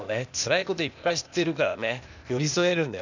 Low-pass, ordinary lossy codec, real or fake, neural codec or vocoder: 7.2 kHz; none; fake; codec, 16 kHz, 0.8 kbps, ZipCodec